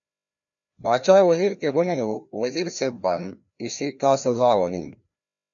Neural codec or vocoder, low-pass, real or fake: codec, 16 kHz, 1 kbps, FreqCodec, larger model; 7.2 kHz; fake